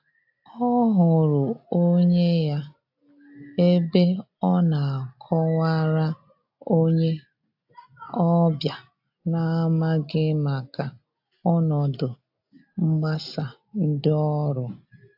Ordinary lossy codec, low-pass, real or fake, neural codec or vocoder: AAC, 48 kbps; 5.4 kHz; real; none